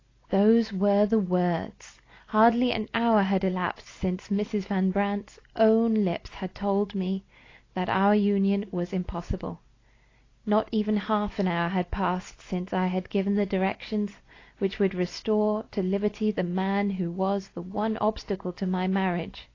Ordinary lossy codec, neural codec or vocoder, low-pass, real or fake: AAC, 32 kbps; none; 7.2 kHz; real